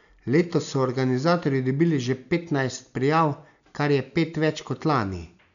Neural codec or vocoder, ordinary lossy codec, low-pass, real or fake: none; none; 7.2 kHz; real